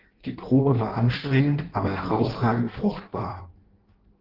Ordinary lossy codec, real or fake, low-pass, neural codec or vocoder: Opus, 16 kbps; fake; 5.4 kHz; codec, 16 kHz in and 24 kHz out, 0.6 kbps, FireRedTTS-2 codec